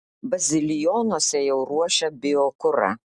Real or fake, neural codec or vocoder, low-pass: real; none; 10.8 kHz